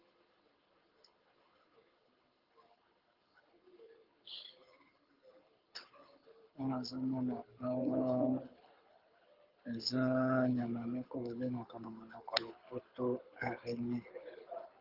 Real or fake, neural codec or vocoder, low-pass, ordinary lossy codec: fake; codec, 24 kHz, 6 kbps, HILCodec; 5.4 kHz; Opus, 32 kbps